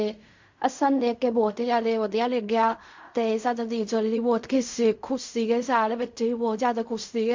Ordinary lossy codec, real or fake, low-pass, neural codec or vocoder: MP3, 48 kbps; fake; 7.2 kHz; codec, 16 kHz in and 24 kHz out, 0.4 kbps, LongCat-Audio-Codec, fine tuned four codebook decoder